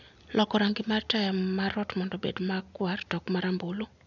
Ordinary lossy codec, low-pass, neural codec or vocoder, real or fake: none; 7.2 kHz; none; real